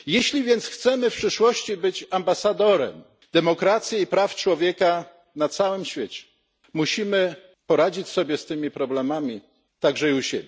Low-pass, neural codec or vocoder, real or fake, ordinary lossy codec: none; none; real; none